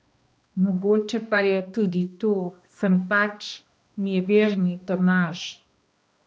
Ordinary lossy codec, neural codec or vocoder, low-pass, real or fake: none; codec, 16 kHz, 1 kbps, X-Codec, HuBERT features, trained on balanced general audio; none; fake